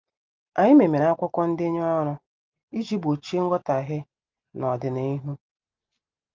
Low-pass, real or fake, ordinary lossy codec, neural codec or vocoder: 7.2 kHz; real; Opus, 32 kbps; none